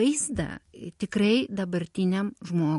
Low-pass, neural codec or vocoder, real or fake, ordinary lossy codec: 14.4 kHz; none; real; MP3, 48 kbps